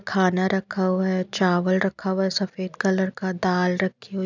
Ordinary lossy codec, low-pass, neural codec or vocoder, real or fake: none; 7.2 kHz; none; real